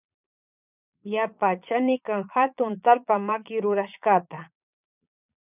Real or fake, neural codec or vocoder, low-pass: fake; vocoder, 22.05 kHz, 80 mel bands, Vocos; 3.6 kHz